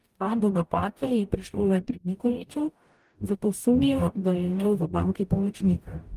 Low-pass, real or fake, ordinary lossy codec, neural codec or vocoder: 14.4 kHz; fake; Opus, 32 kbps; codec, 44.1 kHz, 0.9 kbps, DAC